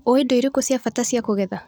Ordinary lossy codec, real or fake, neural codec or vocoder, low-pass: none; real; none; none